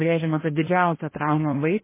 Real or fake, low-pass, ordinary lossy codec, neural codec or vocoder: fake; 3.6 kHz; MP3, 16 kbps; codec, 16 kHz, 1 kbps, FreqCodec, larger model